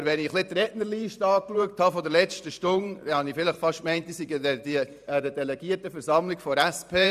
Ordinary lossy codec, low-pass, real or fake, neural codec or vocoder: none; 14.4 kHz; fake; vocoder, 44.1 kHz, 128 mel bands every 512 samples, BigVGAN v2